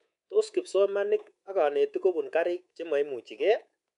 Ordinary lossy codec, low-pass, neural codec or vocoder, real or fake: none; 10.8 kHz; codec, 24 kHz, 3.1 kbps, DualCodec; fake